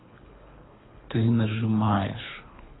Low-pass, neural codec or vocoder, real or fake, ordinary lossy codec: 7.2 kHz; codec, 24 kHz, 3 kbps, HILCodec; fake; AAC, 16 kbps